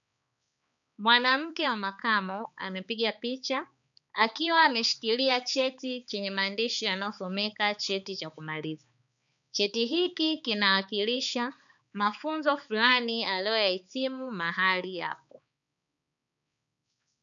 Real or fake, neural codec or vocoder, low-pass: fake; codec, 16 kHz, 4 kbps, X-Codec, HuBERT features, trained on balanced general audio; 7.2 kHz